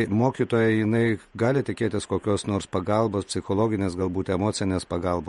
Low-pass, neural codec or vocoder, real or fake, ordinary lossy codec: 19.8 kHz; vocoder, 48 kHz, 128 mel bands, Vocos; fake; MP3, 48 kbps